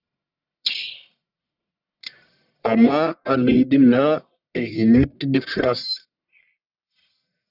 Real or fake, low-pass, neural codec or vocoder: fake; 5.4 kHz; codec, 44.1 kHz, 1.7 kbps, Pupu-Codec